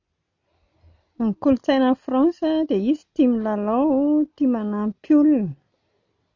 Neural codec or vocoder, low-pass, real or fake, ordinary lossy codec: none; 7.2 kHz; real; none